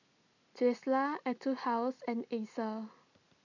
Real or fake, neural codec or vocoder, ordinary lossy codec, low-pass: real; none; none; 7.2 kHz